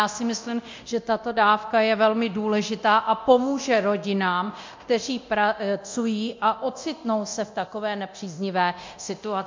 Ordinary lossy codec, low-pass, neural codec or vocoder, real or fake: MP3, 48 kbps; 7.2 kHz; codec, 24 kHz, 0.9 kbps, DualCodec; fake